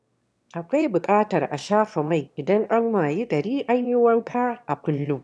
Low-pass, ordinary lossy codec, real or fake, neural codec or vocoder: none; none; fake; autoencoder, 22.05 kHz, a latent of 192 numbers a frame, VITS, trained on one speaker